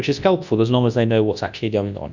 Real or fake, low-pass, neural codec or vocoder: fake; 7.2 kHz; codec, 24 kHz, 0.9 kbps, WavTokenizer, large speech release